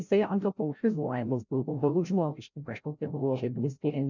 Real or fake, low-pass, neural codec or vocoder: fake; 7.2 kHz; codec, 16 kHz, 0.5 kbps, FreqCodec, larger model